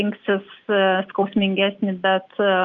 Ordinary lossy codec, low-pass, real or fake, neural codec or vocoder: Opus, 24 kbps; 7.2 kHz; real; none